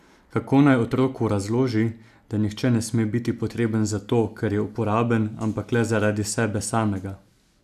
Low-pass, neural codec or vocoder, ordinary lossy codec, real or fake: 14.4 kHz; none; none; real